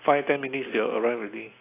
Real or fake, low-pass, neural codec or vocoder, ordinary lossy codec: real; 3.6 kHz; none; AAC, 24 kbps